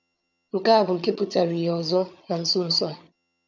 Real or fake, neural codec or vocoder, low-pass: fake; vocoder, 22.05 kHz, 80 mel bands, HiFi-GAN; 7.2 kHz